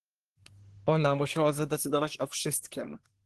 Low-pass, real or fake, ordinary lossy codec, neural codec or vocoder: 10.8 kHz; fake; Opus, 16 kbps; codec, 24 kHz, 1 kbps, SNAC